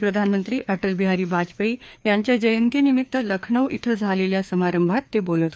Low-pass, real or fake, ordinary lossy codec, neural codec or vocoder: none; fake; none; codec, 16 kHz, 2 kbps, FreqCodec, larger model